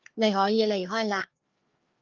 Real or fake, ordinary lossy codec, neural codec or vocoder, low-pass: fake; Opus, 24 kbps; codec, 16 kHz, 2 kbps, FreqCodec, larger model; 7.2 kHz